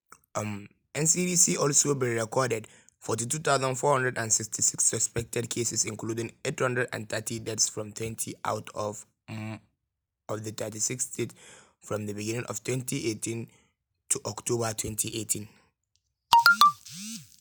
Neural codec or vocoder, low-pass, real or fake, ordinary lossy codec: none; none; real; none